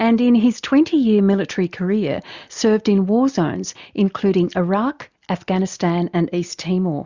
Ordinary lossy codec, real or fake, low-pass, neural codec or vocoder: Opus, 64 kbps; real; 7.2 kHz; none